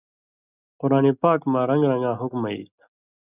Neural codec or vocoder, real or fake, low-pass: none; real; 3.6 kHz